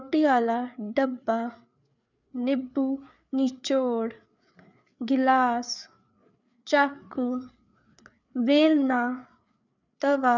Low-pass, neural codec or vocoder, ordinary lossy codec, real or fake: 7.2 kHz; codec, 16 kHz, 4 kbps, FreqCodec, larger model; none; fake